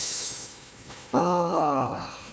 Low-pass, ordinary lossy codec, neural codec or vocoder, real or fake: none; none; codec, 16 kHz, 1 kbps, FunCodec, trained on Chinese and English, 50 frames a second; fake